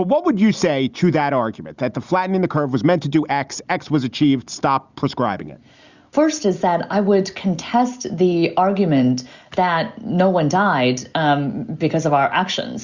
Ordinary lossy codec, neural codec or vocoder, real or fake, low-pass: Opus, 64 kbps; none; real; 7.2 kHz